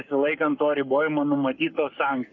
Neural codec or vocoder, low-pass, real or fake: codec, 44.1 kHz, 7.8 kbps, Pupu-Codec; 7.2 kHz; fake